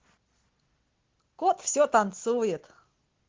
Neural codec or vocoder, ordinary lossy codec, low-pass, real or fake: none; Opus, 16 kbps; 7.2 kHz; real